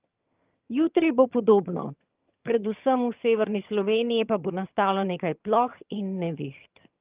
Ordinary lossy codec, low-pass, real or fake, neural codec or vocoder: Opus, 24 kbps; 3.6 kHz; fake; vocoder, 22.05 kHz, 80 mel bands, HiFi-GAN